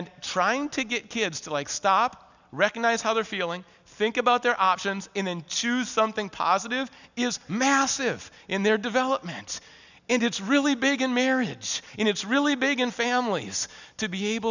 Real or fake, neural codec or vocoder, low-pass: real; none; 7.2 kHz